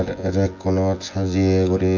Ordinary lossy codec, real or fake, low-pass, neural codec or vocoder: AAC, 48 kbps; fake; 7.2 kHz; vocoder, 24 kHz, 100 mel bands, Vocos